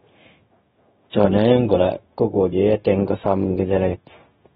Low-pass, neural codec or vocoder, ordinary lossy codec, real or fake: 7.2 kHz; codec, 16 kHz, 0.4 kbps, LongCat-Audio-Codec; AAC, 16 kbps; fake